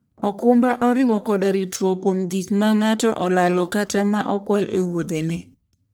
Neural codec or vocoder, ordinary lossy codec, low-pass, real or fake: codec, 44.1 kHz, 1.7 kbps, Pupu-Codec; none; none; fake